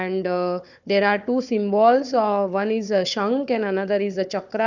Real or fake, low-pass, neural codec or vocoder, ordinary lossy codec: fake; 7.2 kHz; codec, 16 kHz, 4 kbps, FunCodec, trained on Chinese and English, 50 frames a second; none